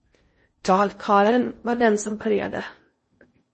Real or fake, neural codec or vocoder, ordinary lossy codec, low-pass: fake; codec, 16 kHz in and 24 kHz out, 0.6 kbps, FocalCodec, streaming, 4096 codes; MP3, 32 kbps; 10.8 kHz